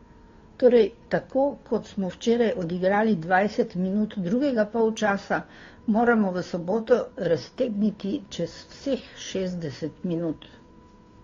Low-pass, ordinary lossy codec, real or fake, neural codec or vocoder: 7.2 kHz; AAC, 32 kbps; fake; codec, 16 kHz, 2 kbps, FunCodec, trained on Chinese and English, 25 frames a second